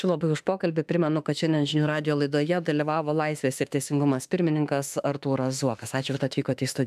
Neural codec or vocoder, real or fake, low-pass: autoencoder, 48 kHz, 32 numbers a frame, DAC-VAE, trained on Japanese speech; fake; 14.4 kHz